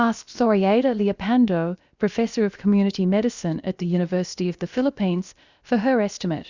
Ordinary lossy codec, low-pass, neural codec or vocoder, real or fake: Opus, 64 kbps; 7.2 kHz; codec, 16 kHz, about 1 kbps, DyCAST, with the encoder's durations; fake